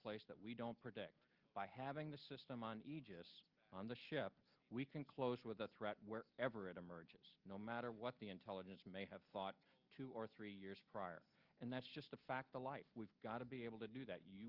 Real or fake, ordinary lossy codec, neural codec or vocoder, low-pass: real; Opus, 32 kbps; none; 5.4 kHz